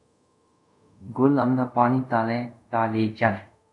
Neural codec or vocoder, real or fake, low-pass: codec, 24 kHz, 0.5 kbps, DualCodec; fake; 10.8 kHz